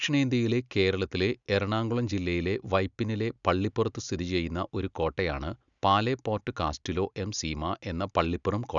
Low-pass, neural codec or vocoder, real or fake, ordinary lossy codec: 7.2 kHz; none; real; none